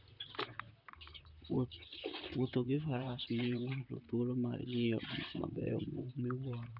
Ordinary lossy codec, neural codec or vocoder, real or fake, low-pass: none; vocoder, 44.1 kHz, 128 mel bands, Pupu-Vocoder; fake; 5.4 kHz